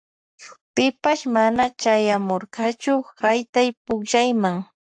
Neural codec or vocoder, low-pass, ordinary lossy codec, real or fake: codec, 44.1 kHz, 7.8 kbps, Pupu-Codec; 9.9 kHz; AAC, 64 kbps; fake